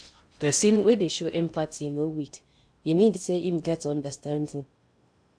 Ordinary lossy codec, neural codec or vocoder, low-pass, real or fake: AAC, 64 kbps; codec, 16 kHz in and 24 kHz out, 0.6 kbps, FocalCodec, streaming, 2048 codes; 9.9 kHz; fake